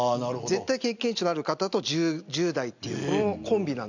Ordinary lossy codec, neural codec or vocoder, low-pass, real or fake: none; none; 7.2 kHz; real